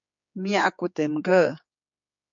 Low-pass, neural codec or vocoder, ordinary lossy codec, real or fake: 7.2 kHz; codec, 16 kHz, 4 kbps, X-Codec, HuBERT features, trained on general audio; MP3, 48 kbps; fake